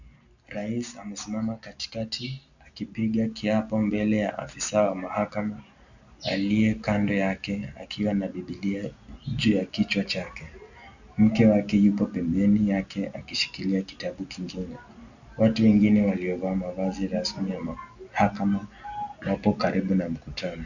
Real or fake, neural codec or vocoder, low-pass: real; none; 7.2 kHz